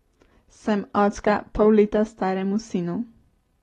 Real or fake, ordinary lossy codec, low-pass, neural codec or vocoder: real; AAC, 32 kbps; 19.8 kHz; none